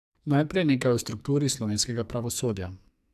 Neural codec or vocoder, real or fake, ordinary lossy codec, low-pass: codec, 44.1 kHz, 2.6 kbps, SNAC; fake; AAC, 96 kbps; 14.4 kHz